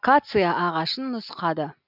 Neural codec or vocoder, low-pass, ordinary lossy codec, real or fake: none; 5.4 kHz; none; real